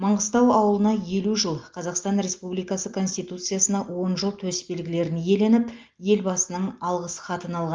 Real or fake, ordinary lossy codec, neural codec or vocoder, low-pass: real; Opus, 32 kbps; none; 7.2 kHz